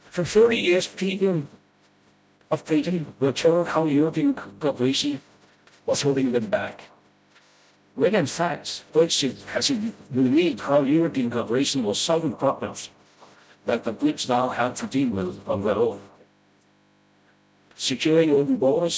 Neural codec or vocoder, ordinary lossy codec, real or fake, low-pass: codec, 16 kHz, 0.5 kbps, FreqCodec, smaller model; none; fake; none